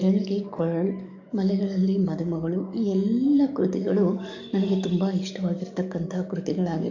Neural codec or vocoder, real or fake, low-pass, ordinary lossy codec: codec, 44.1 kHz, 7.8 kbps, DAC; fake; 7.2 kHz; none